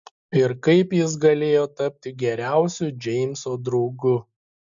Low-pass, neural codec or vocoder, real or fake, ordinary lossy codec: 7.2 kHz; none; real; MP3, 64 kbps